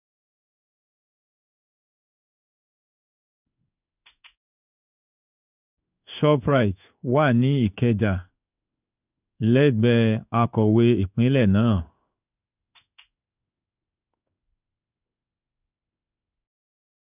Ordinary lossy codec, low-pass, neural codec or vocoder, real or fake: none; 3.6 kHz; codec, 16 kHz in and 24 kHz out, 1 kbps, XY-Tokenizer; fake